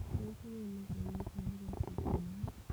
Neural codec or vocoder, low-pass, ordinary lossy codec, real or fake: codec, 44.1 kHz, 7.8 kbps, DAC; none; none; fake